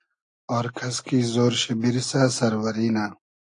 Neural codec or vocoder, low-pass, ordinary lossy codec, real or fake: none; 9.9 kHz; AAC, 48 kbps; real